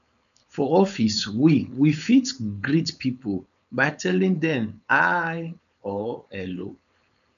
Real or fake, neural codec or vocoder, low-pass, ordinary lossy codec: fake; codec, 16 kHz, 4.8 kbps, FACodec; 7.2 kHz; none